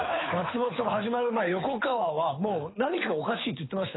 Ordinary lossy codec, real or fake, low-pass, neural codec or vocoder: AAC, 16 kbps; fake; 7.2 kHz; codec, 24 kHz, 6 kbps, HILCodec